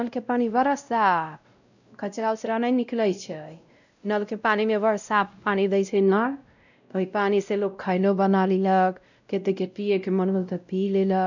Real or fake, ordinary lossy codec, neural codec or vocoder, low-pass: fake; none; codec, 16 kHz, 0.5 kbps, X-Codec, WavLM features, trained on Multilingual LibriSpeech; 7.2 kHz